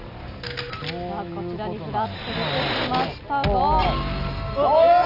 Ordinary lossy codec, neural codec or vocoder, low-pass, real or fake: none; none; 5.4 kHz; real